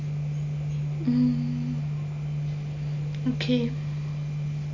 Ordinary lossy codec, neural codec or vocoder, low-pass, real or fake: none; none; 7.2 kHz; real